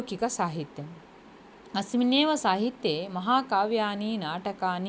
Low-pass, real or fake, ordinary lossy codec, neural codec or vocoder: none; real; none; none